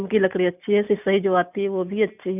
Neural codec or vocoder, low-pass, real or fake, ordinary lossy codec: none; 3.6 kHz; real; none